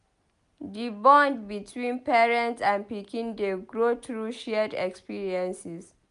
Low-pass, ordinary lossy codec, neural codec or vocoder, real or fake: 10.8 kHz; none; none; real